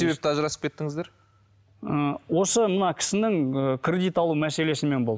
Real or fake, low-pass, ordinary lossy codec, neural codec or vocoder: real; none; none; none